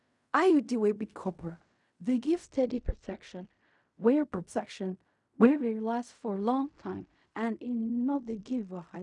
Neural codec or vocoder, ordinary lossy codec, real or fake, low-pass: codec, 16 kHz in and 24 kHz out, 0.4 kbps, LongCat-Audio-Codec, fine tuned four codebook decoder; none; fake; 10.8 kHz